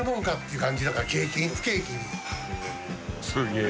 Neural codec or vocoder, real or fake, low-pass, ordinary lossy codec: none; real; none; none